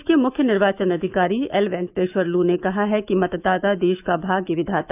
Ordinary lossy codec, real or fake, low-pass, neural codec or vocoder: none; fake; 3.6 kHz; autoencoder, 48 kHz, 128 numbers a frame, DAC-VAE, trained on Japanese speech